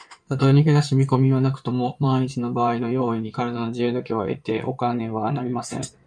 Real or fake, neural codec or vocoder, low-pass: fake; codec, 16 kHz in and 24 kHz out, 2.2 kbps, FireRedTTS-2 codec; 9.9 kHz